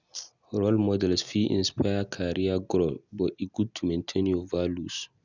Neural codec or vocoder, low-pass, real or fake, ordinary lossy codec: none; 7.2 kHz; real; none